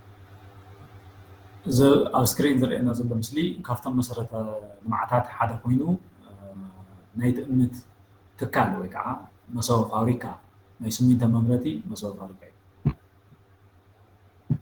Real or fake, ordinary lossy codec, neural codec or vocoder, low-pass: fake; Opus, 16 kbps; vocoder, 48 kHz, 128 mel bands, Vocos; 19.8 kHz